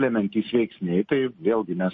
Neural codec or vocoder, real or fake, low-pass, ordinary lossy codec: none; real; 7.2 kHz; MP3, 32 kbps